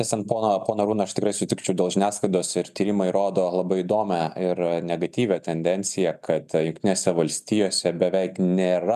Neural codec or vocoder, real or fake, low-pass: none; real; 14.4 kHz